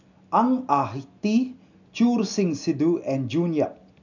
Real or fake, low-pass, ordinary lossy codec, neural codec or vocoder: real; 7.2 kHz; none; none